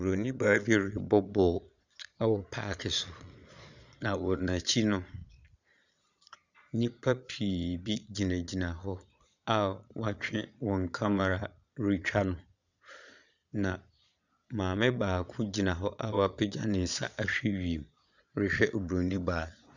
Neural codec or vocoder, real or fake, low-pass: vocoder, 22.05 kHz, 80 mel bands, Vocos; fake; 7.2 kHz